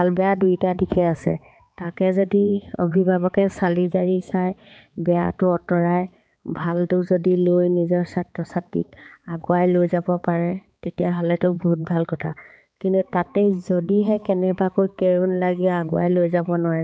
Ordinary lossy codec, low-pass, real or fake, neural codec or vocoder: none; none; fake; codec, 16 kHz, 4 kbps, X-Codec, HuBERT features, trained on balanced general audio